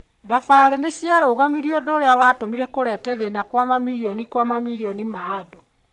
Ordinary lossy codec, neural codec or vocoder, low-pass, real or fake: none; codec, 44.1 kHz, 3.4 kbps, Pupu-Codec; 10.8 kHz; fake